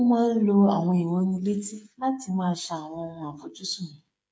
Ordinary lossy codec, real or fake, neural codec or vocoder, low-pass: none; fake; codec, 16 kHz, 8 kbps, FreqCodec, smaller model; none